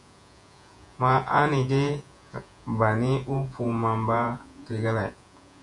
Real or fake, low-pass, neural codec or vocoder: fake; 10.8 kHz; vocoder, 48 kHz, 128 mel bands, Vocos